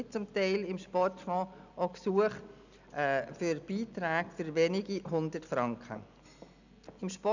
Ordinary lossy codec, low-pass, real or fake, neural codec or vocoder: none; 7.2 kHz; real; none